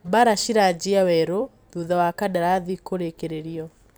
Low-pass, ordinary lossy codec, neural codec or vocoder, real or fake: none; none; vocoder, 44.1 kHz, 128 mel bands every 256 samples, BigVGAN v2; fake